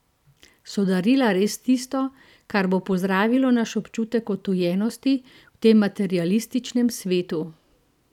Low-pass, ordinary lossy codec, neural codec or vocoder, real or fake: 19.8 kHz; none; vocoder, 44.1 kHz, 128 mel bands every 512 samples, BigVGAN v2; fake